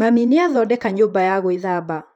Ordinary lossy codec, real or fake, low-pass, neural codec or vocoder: none; fake; 19.8 kHz; vocoder, 44.1 kHz, 128 mel bands, Pupu-Vocoder